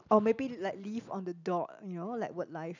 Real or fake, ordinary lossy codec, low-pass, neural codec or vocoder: real; none; 7.2 kHz; none